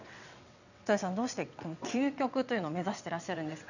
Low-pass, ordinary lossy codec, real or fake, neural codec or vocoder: 7.2 kHz; none; fake; vocoder, 22.05 kHz, 80 mel bands, WaveNeXt